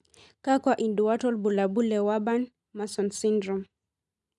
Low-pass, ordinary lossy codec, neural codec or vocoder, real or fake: 10.8 kHz; none; none; real